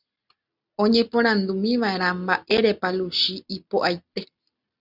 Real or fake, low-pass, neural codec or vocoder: real; 5.4 kHz; none